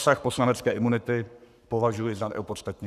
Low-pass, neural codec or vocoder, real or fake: 14.4 kHz; codec, 44.1 kHz, 7.8 kbps, Pupu-Codec; fake